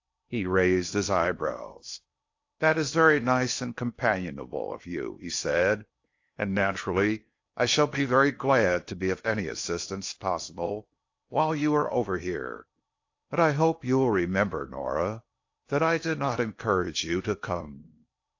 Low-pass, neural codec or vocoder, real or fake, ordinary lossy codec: 7.2 kHz; codec, 16 kHz in and 24 kHz out, 0.6 kbps, FocalCodec, streaming, 4096 codes; fake; AAC, 48 kbps